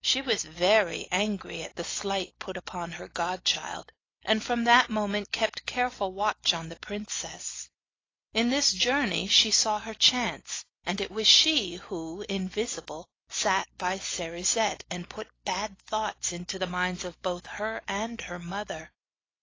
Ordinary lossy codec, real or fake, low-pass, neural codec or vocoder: AAC, 32 kbps; real; 7.2 kHz; none